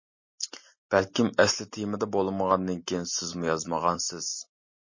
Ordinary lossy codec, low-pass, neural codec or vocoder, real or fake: MP3, 32 kbps; 7.2 kHz; none; real